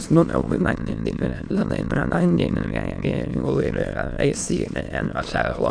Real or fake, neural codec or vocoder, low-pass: fake; autoencoder, 22.05 kHz, a latent of 192 numbers a frame, VITS, trained on many speakers; 9.9 kHz